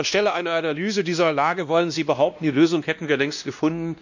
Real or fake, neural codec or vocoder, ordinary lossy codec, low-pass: fake; codec, 16 kHz, 1 kbps, X-Codec, WavLM features, trained on Multilingual LibriSpeech; none; 7.2 kHz